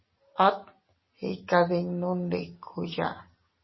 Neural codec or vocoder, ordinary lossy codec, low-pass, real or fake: none; MP3, 24 kbps; 7.2 kHz; real